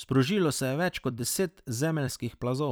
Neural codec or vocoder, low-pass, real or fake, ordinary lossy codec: vocoder, 44.1 kHz, 128 mel bands every 256 samples, BigVGAN v2; none; fake; none